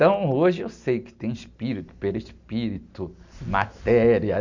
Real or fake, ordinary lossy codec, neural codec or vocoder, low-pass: real; Opus, 64 kbps; none; 7.2 kHz